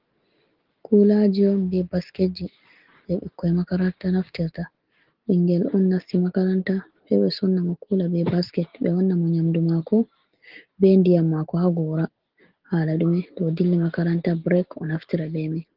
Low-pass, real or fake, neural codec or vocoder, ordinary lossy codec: 5.4 kHz; real; none; Opus, 16 kbps